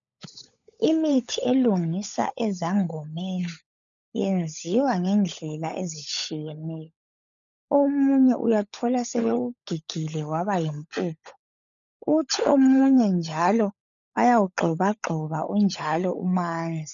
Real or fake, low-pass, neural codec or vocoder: fake; 7.2 kHz; codec, 16 kHz, 16 kbps, FunCodec, trained on LibriTTS, 50 frames a second